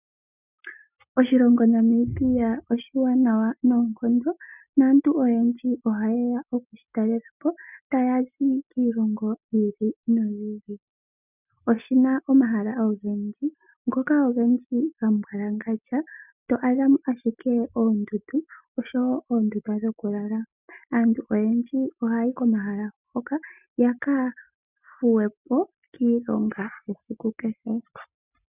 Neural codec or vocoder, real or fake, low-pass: none; real; 3.6 kHz